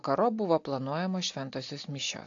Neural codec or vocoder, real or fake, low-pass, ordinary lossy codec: none; real; 7.2 kHz; AAC, 48 kbps